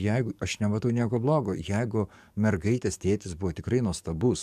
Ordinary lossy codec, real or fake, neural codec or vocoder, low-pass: MP3, 96 kbps; fake; codec, 44.1 kHz, 7.8 kbps, DAC; 14.4 kHz